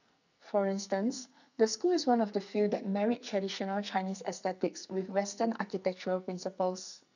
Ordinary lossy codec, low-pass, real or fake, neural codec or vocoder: none; 7.2 kHz; fake; codec, 44.1 kHz, 2.6 kbps, SNAC